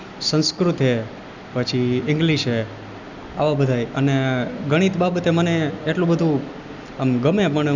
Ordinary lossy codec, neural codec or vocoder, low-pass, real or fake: none; none; 7.2 kHz; real